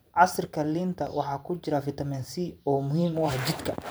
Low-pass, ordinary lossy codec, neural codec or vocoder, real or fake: none; none; vocoder, 44.1 kHz, 128 mel bands every 512 samples, BigVGAN v2; fake